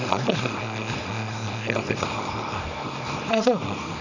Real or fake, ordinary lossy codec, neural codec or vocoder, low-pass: fake; none; codec, 24 kHz, 0.9 kbps, WavTokenizer, small release; 7.2 kHz